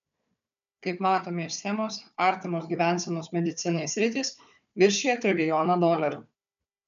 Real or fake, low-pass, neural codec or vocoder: fake; 7.2 kHz; codec, 16 kHz, 4 kbps, FunCodec, trained on Chinese and English, 50 frames a second